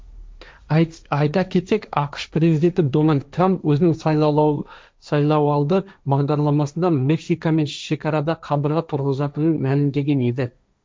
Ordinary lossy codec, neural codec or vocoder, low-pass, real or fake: none; codec, 16 kHz, 1.1 kbps, Voila-Tokenizer; none; fake